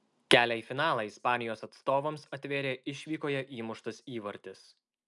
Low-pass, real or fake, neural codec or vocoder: 10.8 kHz; real; none